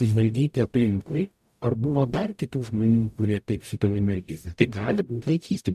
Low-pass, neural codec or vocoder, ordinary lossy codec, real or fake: 14.4 kHz; codec, 44.1 kHz, 0.9 kbps, DAC; MP3, 96 kbps; fake